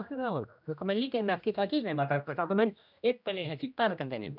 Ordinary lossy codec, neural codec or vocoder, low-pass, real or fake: none; codec, 16 kHz, 1 kbps, X-Codec, HuBERT features, trained on general audio; 5.4 kHz; fake